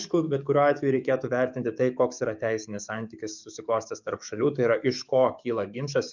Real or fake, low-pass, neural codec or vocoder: fake; 7.2 kHz; codec, 44.1 kHz, 7.8 kbps, DAC